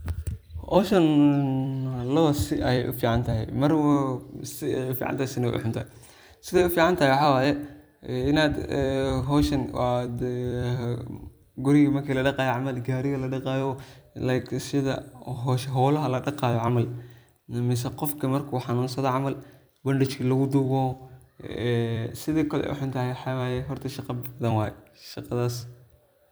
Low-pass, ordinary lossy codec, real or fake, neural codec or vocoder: none; none; fake; vocoder, 44.1 kHz, 128 mel bands every 256 samples, BigVGAN v2